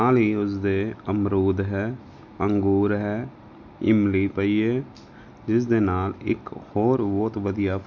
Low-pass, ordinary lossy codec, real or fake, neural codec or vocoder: 7.2 kHz; none; real; none